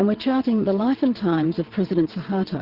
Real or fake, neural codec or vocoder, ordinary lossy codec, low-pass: fake; vocoder, 22.05 kHz, 80 mel bands, WaveNeXt; Opus, 16 kbps; 5.4 kHz